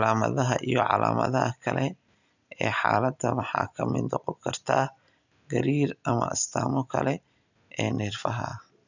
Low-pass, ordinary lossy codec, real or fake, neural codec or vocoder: 7.2 kHz; none; real; none